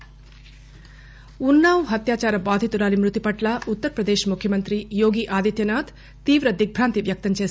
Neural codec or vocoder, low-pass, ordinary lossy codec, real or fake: none; none; none; real